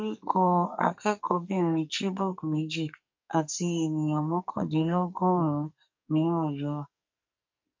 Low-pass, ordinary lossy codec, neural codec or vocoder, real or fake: 7.2 kHz; MP3, 48 kbps; codec, 44.1 kHz, 2.6 kbps, SNAC; fake